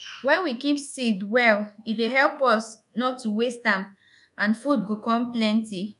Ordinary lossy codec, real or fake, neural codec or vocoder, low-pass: none; fake; codec, 24 kHz, 1.2 kbps, DualCodec; 10.8 kHz